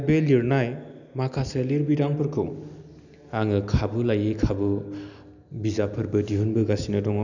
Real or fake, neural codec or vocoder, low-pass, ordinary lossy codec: real; none; 7.2 kHz; none